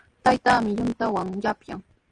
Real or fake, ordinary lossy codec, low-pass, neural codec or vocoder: real; Opus, 32 kbps; 9.9 kHz; none